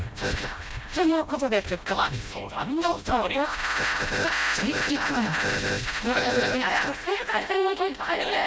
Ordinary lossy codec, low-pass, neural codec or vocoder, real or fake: none; none; codec, 16 kHz, 0.5 kbps, FreqCodec, smaller model; fake